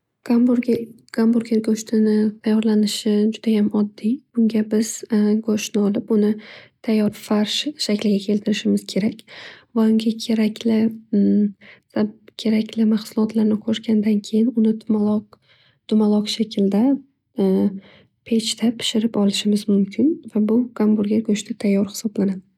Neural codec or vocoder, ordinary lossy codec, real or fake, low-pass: none; none; real; 19.8 kHz